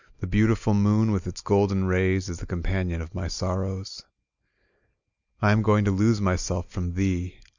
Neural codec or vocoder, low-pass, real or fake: none; 7.2 kHz; real